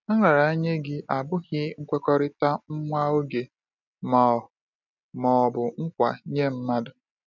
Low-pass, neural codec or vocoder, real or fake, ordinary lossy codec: 7.2 kHz; none; real; none